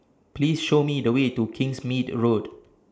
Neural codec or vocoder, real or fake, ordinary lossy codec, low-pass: none; real; none; none